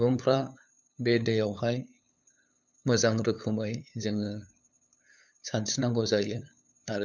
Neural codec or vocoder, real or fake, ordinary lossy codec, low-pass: codec, 16 kHz, 8 kbps, FunCodec, trained on LibriTTS, 25 frames a second; fake; none; 7.2 kHz